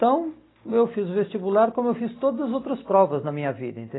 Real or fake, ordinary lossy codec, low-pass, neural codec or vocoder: real; AAC, 16 kbps; 7.2 kHz; none